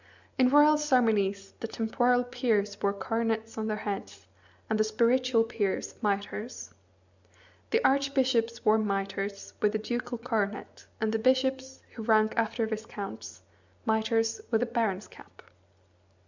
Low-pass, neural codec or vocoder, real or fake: 7.2 kHz; none; real